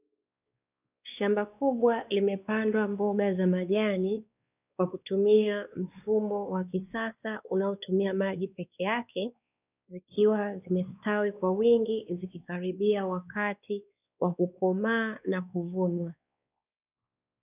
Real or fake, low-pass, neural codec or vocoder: fake; 3.6 kHz; codec, 16 kHz, 2 kbps, X-Codec, WavLM features, trained on Multilingual LibriSpeech